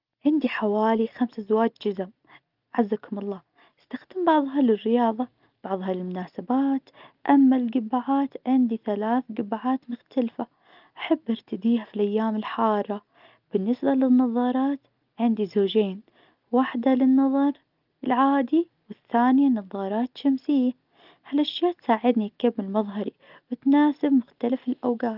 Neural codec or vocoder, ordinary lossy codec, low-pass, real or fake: none; Opus, 24 kbps; 5.4 kHz; real